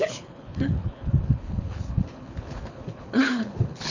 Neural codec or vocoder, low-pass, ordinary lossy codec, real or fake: codec, 24 kHz, 6 kbps, HILCodec; 7.2 kHz; none; fake